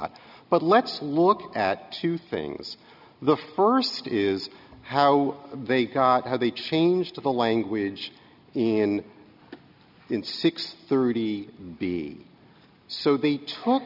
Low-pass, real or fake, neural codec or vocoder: 5.4 kHz; real; none